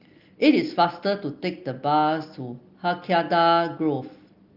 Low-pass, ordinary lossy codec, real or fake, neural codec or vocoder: 5.4 kHz; Opus, 32 kbps; real; none